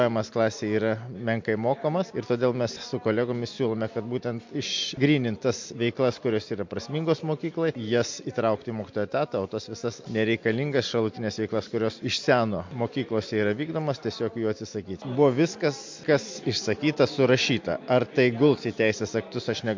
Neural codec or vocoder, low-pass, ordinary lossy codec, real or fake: none; 7.2 kHz; MP3, 48 kbps; real